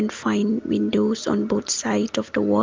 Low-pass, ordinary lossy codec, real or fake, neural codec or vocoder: 7.2 kHz; Opus, 24 kbps; real; none